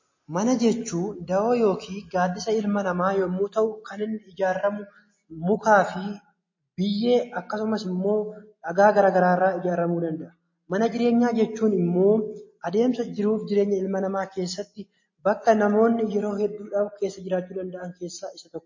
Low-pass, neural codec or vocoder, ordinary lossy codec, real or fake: 7.2 kHz; none; MP3, 32 kbps; real